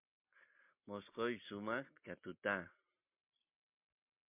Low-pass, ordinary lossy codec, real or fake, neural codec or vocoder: 3.6 kHz; MP3, 32 kbps; real; none